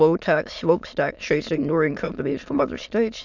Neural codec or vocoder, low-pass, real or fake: autoencoder, 22.05 kHz, a latent of 192 numbers a frame, VITS, trained on many speakers; 7.2 kHz; fake